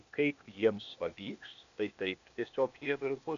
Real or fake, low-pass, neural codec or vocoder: fake; 7.2 kHz; codec, 16 kHz, 0.8 kbps, ZipCodec